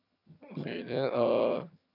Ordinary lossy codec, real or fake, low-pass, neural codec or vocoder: none; fake; 5.4 kHz; vocoder, 22.05 kHz, 80 mel bands, HiFi-GAN